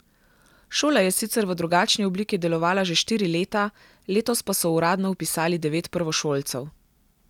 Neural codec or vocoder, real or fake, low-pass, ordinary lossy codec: none; real; 19.8 kHz; none